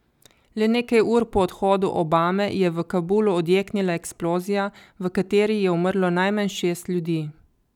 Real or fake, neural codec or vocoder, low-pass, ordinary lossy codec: real; none; 19.8 kHz; none